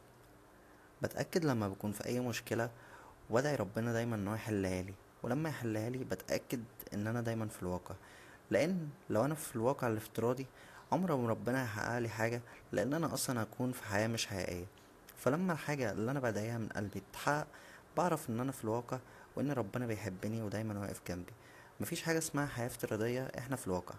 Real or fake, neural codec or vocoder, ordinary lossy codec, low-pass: real; none; AAC, 64 kbps; 14.4 kHz